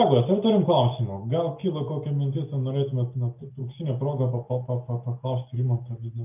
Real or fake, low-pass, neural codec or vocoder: real; 3.6 kHz; none